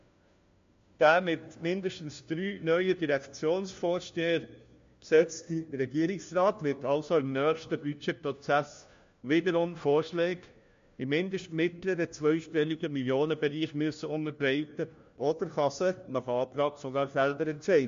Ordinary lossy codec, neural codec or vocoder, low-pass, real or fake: MP3, 48 kbps; codec, 16 kHz, 1 kbps, FunCodec, trained on LibriTTS, 50 frames a second; 7.2 kHz; fake